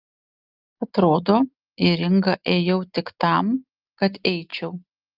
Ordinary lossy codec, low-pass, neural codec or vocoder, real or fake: Opus, 24 kbps; 5.4 kHz; none; real